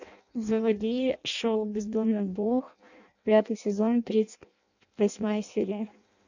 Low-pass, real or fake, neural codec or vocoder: 7.2 kHz; fake; codec, 16 kHz in and 24 kHz out, 0.6 kbps, FireRedTTS-2 codec